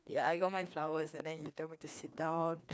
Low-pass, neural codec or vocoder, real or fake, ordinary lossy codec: none; codec, 16 kHz, 2 kbps, FreqCodec, larger model; fake; none